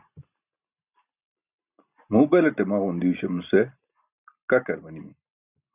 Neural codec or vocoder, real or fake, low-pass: none; real; 3.6 kHz